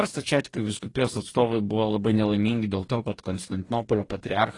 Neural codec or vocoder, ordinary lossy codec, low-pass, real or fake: codec, 44.1 kHz, 2.6 kbps, DAC; AAC, 32 kbps; 10.8 kHz; fake